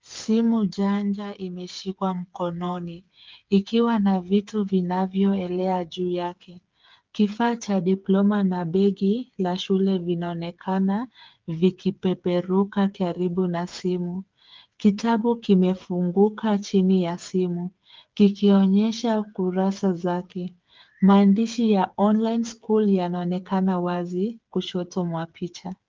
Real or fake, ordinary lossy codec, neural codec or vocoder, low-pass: fake; Opus, 32 kbps; codec, 16 kHz, 8 kbps, FreqCodec, smaller model; 7.2 kHz